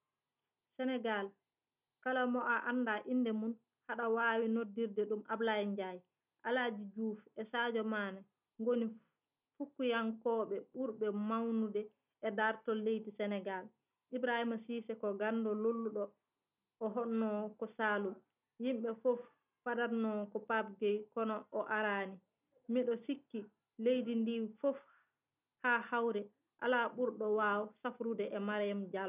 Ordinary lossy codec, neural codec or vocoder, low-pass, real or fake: none; none; 3.6 kHz; real